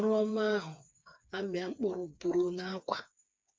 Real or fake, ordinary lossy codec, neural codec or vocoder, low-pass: fake; none; codec, 16 kHz, 4 kbps, FreqCodec, smaller model; none